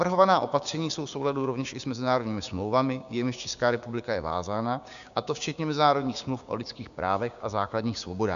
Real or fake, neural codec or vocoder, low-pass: fake; codec, 16 kHz, 6 kbps, DAC; 7.2 kHz